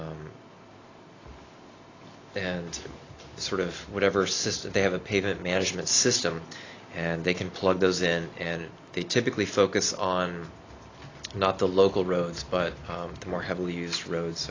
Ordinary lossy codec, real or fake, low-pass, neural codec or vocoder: AAC, 32 kbps; real; 7.2 kHz; none